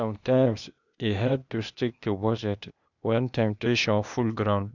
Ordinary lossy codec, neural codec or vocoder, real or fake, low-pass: none; codec, 16 kHz, 0.8 kbps, ZipCodec; fake; 7.2 kHz